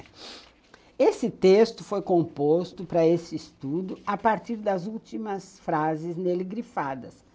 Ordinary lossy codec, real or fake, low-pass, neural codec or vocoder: none; real; none; none